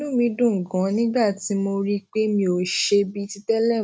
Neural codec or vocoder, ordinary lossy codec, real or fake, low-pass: none; none; real; none